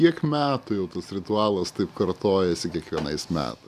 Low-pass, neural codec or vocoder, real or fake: 14.4 kHz; none; real